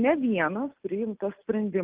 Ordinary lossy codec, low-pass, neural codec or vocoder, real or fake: Opus, 24 kbps; 3.6 kHz; none; real